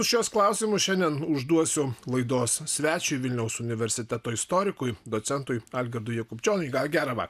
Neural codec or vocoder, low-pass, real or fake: none; 14.4 kHz; real